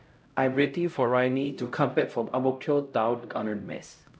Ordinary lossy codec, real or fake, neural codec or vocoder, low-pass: none; fake; codec, 16 kHz, 0.5 kbps, X-Codec, HuBERT features, trained on LibriSpeech; none